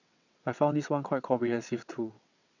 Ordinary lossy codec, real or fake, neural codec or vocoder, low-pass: none; fake; vocoder, 22.05 kHz, 80 mel bands, WaveNeXt; 7.2 kHz